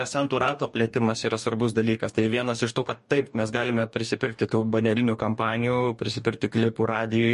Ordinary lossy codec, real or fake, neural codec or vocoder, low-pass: MP3, 48 kbps; fake; codec, 44.1 kHz, 2.6 kbps, DAC; 14.4 kHz